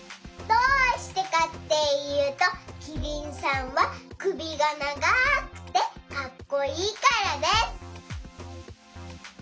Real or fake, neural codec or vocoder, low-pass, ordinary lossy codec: real; none; none; none